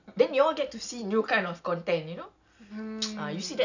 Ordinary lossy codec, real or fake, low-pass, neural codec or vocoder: none; real; 7.2 kHz; none